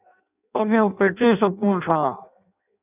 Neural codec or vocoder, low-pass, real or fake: codec, 16 kHz in and 24 kHz out, 0.6 kbps, FireRedTTS-2 codec; 3.6 kHz; fake